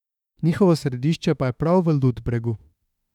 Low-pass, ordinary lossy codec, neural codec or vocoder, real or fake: 19.8 kHz; none; autoencoder, 48 kHz, 32 numbers a frame, DAC-VAE, trained on Japanese speech; fake